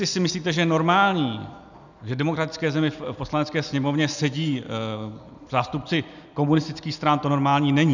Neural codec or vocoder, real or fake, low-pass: none; real; 7.2 kHz